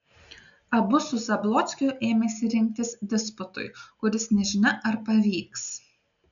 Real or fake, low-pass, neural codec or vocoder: real; 7.2 kHz; none